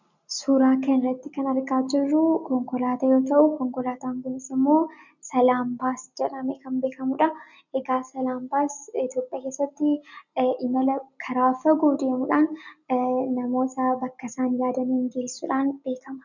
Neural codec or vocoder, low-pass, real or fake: none; 7.2 kHz; real